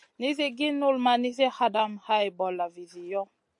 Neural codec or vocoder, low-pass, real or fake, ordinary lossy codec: none; 10.8 kHz; real; AAC, 64 kbps